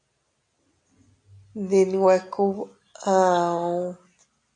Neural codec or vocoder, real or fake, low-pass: none; real; 9.9 kHz